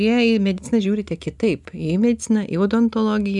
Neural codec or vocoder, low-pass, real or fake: none; 10.8 kHz; real